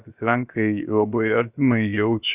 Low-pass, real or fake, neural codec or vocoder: 3.6 kHz; fake; codec, 16 kHz, 0.7 kbps, FocalCodec